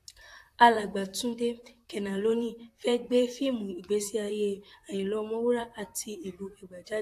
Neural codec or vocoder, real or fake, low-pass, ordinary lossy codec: vocoder, 44.1 kHz, 128 mel bands, Pupu-Vocoder; fake; 14.4 kHz; AAC, 64 kbps